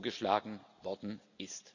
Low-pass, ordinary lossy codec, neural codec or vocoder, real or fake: 7.2 kHz; none; none; real